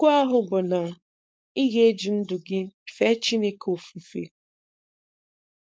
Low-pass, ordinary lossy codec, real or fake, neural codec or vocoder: none; none; fake; codec, 16 kHz, 4.8 kbps, FACodec